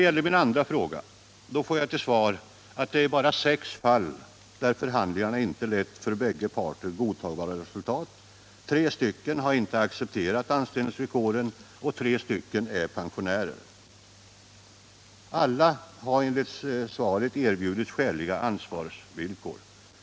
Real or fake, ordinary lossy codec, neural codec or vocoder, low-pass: real; none; none; none